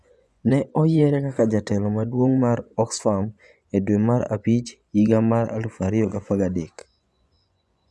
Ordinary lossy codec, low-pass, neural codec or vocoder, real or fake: none; none; vocoder, 24 kHz, 100 mel bands, Vocos; fake